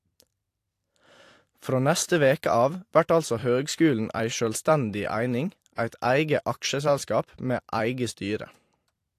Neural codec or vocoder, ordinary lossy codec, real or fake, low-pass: vocoder, 44.1 kHz, 128 mel bands every 256 samples, BigVGAN v2; AAC, 64 kbps; fake; 14.4 kHz